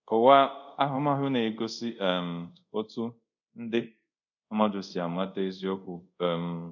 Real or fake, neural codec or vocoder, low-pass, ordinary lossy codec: fake; codec, 24 kHz, 0.5 kbps, DualCodec; 7.2 kHz; none